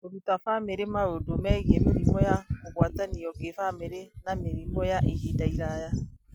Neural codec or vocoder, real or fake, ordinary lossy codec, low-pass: none; real; none; 19.8 kHz